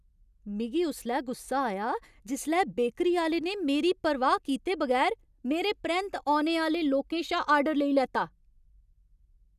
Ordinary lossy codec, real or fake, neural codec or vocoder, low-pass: none; real; none; 14.4 kHz